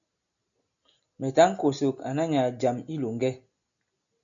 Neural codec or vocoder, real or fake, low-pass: none; real; 7.2 kHz